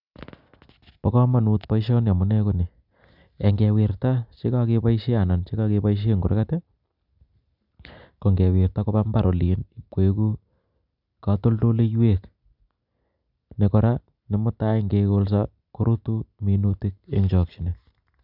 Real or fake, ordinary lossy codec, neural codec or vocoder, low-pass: real; none; none; 5.4 kHz